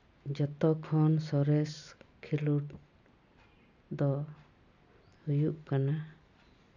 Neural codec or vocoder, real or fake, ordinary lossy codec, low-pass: none; real; none; 7.2 kHz